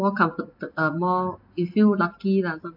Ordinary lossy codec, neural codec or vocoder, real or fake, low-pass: AAC, 48 kbps; none; real; 5.4 kHz